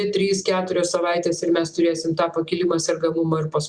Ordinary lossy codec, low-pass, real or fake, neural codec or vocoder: Opus, 64 kbps; 9.9 kHz; real; none